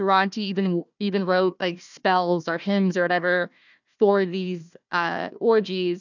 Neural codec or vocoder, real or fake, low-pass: codec, 16 kHz, 1 kbps, FunCodec, trained on Chinese and English, 50 frames a second; fake; 7.2 kHz